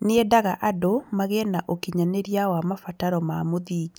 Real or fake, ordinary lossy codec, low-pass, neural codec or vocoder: real; none; none; none